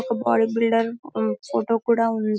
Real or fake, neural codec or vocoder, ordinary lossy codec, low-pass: real; none; none; none